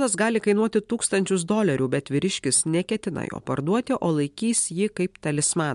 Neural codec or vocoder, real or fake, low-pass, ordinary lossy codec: none; real; 10.8 kHz; MP3, 64 kbps